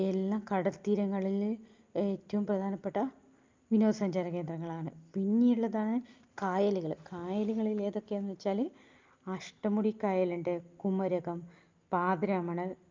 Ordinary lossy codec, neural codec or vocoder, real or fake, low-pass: Opus, 24 kbps; none; real; 7.2 kHz